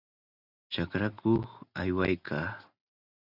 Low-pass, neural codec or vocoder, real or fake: 5.4 kHz; none; real